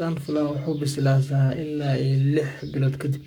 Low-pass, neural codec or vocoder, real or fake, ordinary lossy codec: 19.8 kHz; codec, 44.1 kHz, 7.8 kbps, Pupu-Codec; fake; none